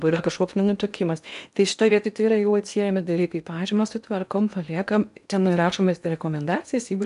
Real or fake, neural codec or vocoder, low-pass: fake; codec, 16 kHz in and 24 kHz out, 0.8 kbps, FocalCodec, streaming, 65536 codes; 10.8 kHz